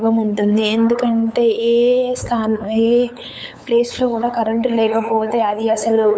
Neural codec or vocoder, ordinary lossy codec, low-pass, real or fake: codec, 16 kHz, 8 kbps, FunCodec, trained on LibriTTS, 25 frames a second; none; none; fake